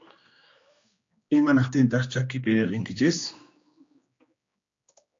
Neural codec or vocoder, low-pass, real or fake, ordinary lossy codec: codec, 16 kHz, 2 kbps, X-Codec, HuBERT features, trained on general audio; 7.2 kHz; fake; AAC, 48 kbps